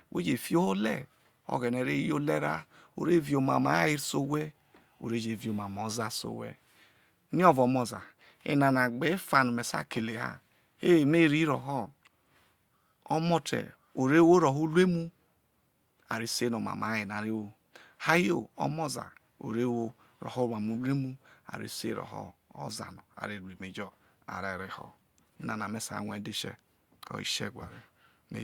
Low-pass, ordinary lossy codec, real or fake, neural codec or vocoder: 19.8 kHz; Opus, 64 kbps; real; none